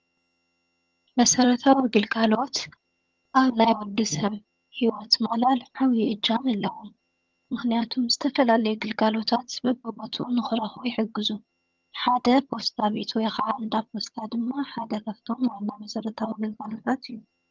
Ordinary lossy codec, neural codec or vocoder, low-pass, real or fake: Opus, 24 kbps; vocoder, 22.05 kHz, 80 mel bands, HiFi-GAN; 7.2 kHz; fake